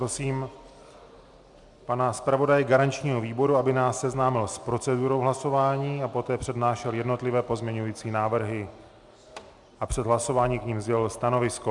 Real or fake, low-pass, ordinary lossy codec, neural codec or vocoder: real; 10.8 kHz; MP3, 96 kbps; none